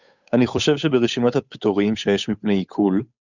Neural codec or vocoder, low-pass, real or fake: codec, 16 kHz, 8 kbps, FunCodec, trained on Chinese and English, 25 frames a second; 7.2 kHz; fake